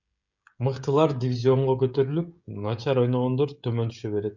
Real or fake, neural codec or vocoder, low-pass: fake; codec, 16 kHz, 16 kbps, FreqCodec, smaller model; 7.2 kHz